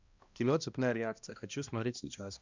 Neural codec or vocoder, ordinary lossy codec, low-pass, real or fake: codec, 16 kHz, 1 kbps, X-Codec, HuBERT features, trained on balanced general audio; Opus, 64 kbps; 7.2 kHz; fake